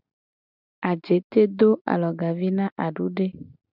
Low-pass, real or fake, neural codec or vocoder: 5.4 kHz; real; none